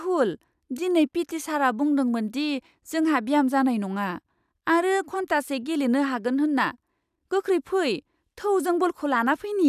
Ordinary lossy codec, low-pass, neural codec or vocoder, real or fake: none; 14.4 kHz; none; real